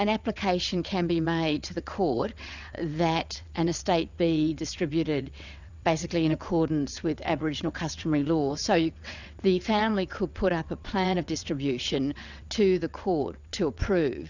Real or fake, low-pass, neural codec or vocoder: fake; 7.2 kHz; vocoder, 22.05 kHz, 80 mel bands, WaveNeXt